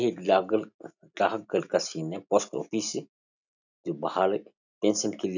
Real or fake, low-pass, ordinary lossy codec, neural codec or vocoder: real; 7.2 kHz; none; none